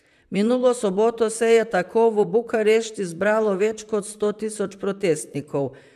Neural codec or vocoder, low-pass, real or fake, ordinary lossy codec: vocoder, 44.1 kHz, 128 mel bands, Pupu-Vocoder; 14.4 kHz; fake; AAC, 96 kbps